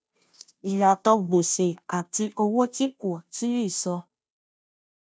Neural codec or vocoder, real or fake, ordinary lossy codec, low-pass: codec, 16 kHz, 0.5 kbps, FunCodec, trained on Chinese and English, 25 frames a second; fake; none; none